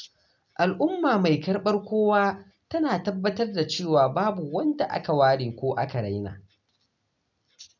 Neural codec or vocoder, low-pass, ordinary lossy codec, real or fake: none; 7.2 kHz; none; real